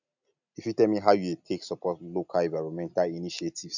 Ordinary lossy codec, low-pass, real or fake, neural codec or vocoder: none; 7.2 kHz; real; none